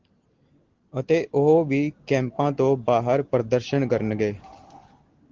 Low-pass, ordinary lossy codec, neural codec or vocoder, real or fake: 7.2 kHz; Opus, 16 kbps; none; real